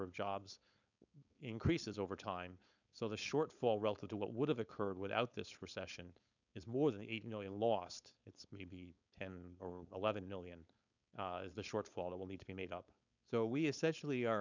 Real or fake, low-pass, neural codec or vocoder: fake; 7.2 kHz; codec, 16 kHz, 4.8 kbps, FACodec